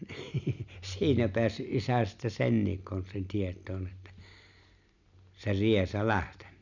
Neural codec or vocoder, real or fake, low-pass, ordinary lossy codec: none; real; 7.2 kHz; none